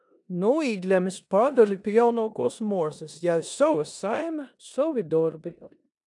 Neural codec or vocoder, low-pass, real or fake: codec, 16 kHz in and 24 kHz out, 0.9 kbps, LongCat-Audio-Codec, four codebook decoder; 10.8 kHz; fake